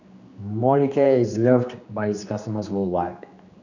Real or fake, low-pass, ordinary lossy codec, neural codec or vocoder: fake; 7.2 kHz; none; codec, 16 kHz, 2 kbps, X-Codec, HuBERT features, trained on general audio